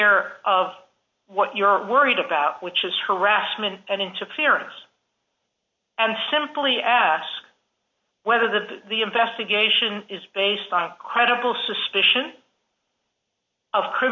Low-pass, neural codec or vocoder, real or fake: 7.2 kHz; none; real